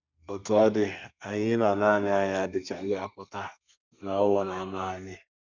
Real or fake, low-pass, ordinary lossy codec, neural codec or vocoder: fake; 7.2 kHz; none; codec, 32 kHz, 1.9 kbps, SNAC